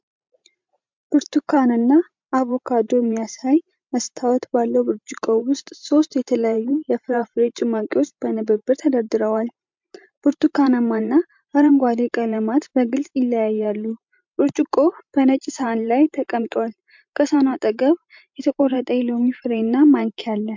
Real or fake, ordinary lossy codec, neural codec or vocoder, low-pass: fake; MP3, 64 kbps; vocoder, 44.1 kHz, 128 mel bands every 512 samples, BigVGAN v2; 7.2 kHz